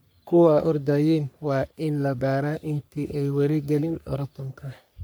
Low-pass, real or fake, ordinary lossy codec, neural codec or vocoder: none; fake; none; codec, 44.1 kHz, 3.4 kbps, Pupu-Codec